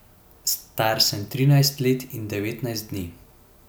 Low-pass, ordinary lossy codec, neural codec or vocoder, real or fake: none; none; none; real